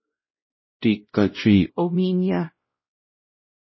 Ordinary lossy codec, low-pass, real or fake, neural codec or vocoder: MP3, 24 kbps; 7.2 kHz; fake; codec, 16 kHz, 0.5 kbps, X-Codec, WavLM features, trained on Multilingual LibriSpeech